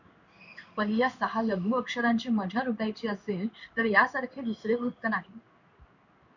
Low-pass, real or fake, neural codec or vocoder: 7.2 kHz; fake; codec, 16 kHz in and 24 kHz out, 1 kbps, XY-Tokenizer